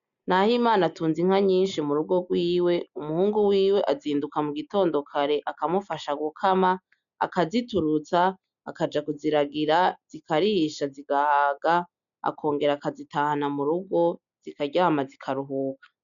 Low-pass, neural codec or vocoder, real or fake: 7.2 kHz; none; real